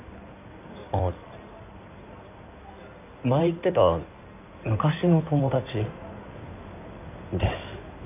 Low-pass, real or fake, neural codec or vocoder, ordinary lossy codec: 3.6 kHz; fake; codec, 16 kHz in and 24 kHz out, 2.2 kbps, FireRedTTS-2 codec; none